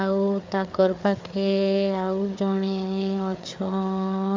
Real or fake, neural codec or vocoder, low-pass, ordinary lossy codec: fake; codec, 16 kHz, 4 kbps, FreqCodec, larger model; 7.2 kHz; MP3, 64 kbps